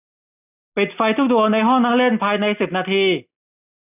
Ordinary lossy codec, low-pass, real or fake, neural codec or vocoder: none; 3.6 kHz; real; none